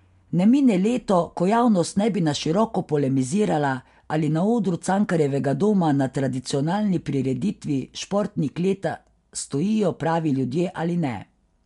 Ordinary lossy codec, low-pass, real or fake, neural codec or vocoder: MP3, 64 kbps; 10.8 kHz; real; none